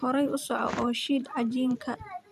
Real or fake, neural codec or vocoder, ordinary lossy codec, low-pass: fake; vocoder, 44.1 kHz, 128 mel bands every 512 samples, BigVGAN v2; none; 14.4 kHz